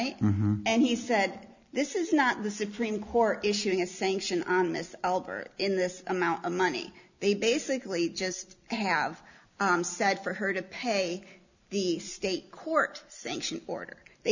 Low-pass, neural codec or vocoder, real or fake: 7.2 kHz; none; real